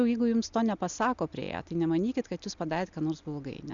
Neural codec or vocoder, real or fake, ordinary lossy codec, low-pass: none; real; Opus, 64 kbps; 7.2 kHz